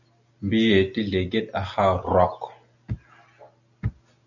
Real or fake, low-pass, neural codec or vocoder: real; 7.2 kHz; none